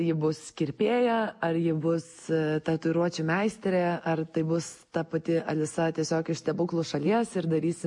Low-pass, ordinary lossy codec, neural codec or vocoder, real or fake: 10.8 kHz; MP3, 48 kbps; none; real